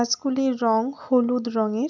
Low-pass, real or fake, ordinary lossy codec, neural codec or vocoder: 7.2 kHz; real; none; none